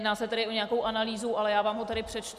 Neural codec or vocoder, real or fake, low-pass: none; real; 14.4 kHz